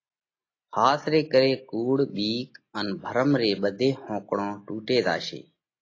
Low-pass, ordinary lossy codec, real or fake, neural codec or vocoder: 7.2 kHz; AAC, 32 kbps; real; none